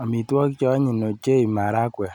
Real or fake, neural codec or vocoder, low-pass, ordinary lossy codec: real; none; 19.8 kHz; none